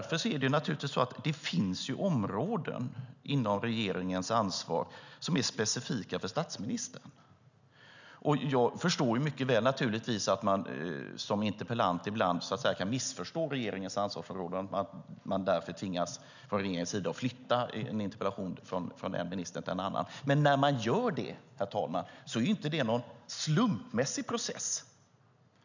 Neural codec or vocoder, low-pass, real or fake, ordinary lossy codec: none; 7.2 kHz; real; none